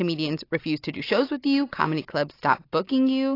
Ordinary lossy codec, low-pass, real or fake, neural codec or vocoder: AAC, 32 kbps; 5.4 kHz; real; none